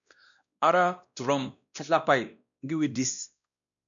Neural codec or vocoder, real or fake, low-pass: codec, 16 kHz, 1 kbps, X-Codec, WavLM features, trained on Multilingual LibriSpeech; fake; 7.2 kHz